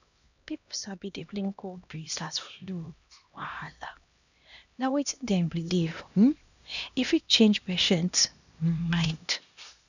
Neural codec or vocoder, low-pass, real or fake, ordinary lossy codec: codec, 16 kHz, 1 kbps, X-Codec, HuBERT features, trained on LibriSpeech; 7.2 kHz; fake; none